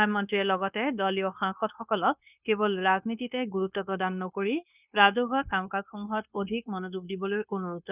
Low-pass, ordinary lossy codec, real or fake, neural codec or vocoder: 3.6 kHz; none; fake; codec, 16 kHz, 0.9 kbps, LongCat-Audio-Codec